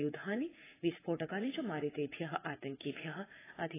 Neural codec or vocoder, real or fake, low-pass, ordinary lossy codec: vocoder, 44.1 kHz, 80 mel bands, Vocos; fake; 3.6 kHz; AAC, 16 kbps